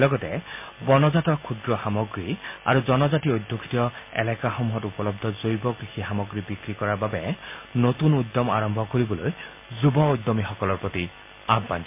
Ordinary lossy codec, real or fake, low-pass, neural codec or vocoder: MP3, 24 kbps; real; 3.6 kHz; none